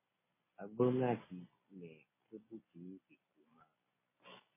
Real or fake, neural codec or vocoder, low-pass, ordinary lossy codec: real; none; 3.6 kHz; MP3, 16 kbps